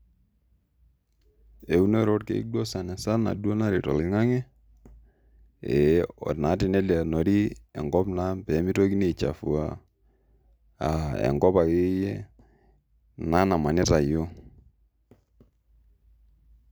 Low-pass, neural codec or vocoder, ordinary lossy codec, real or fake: none; none; none; real